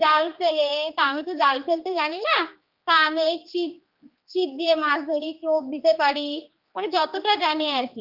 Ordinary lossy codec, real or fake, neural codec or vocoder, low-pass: Opus, 24 kbps; fake; codec, 16 kHz, 2 kbps, X-Codec, HuBERT features, trained on general audio; 5.4 kHz